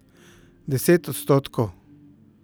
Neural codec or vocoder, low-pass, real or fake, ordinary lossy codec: none; none; real; none